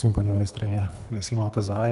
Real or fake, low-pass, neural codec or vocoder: fake; 10.8 kHz; codec, 24 kHz, 3 kbps, HILCodec